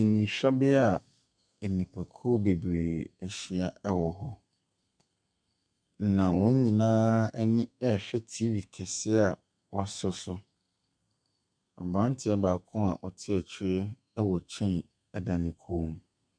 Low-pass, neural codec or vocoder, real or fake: 9.9 kHz; codec, 32 kHz, 1.9 kbps, SNAC; fake